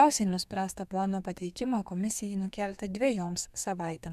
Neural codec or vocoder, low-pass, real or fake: codec, 32 kHz, 1.9 kbps, SNAC; 14.4 kHz; fake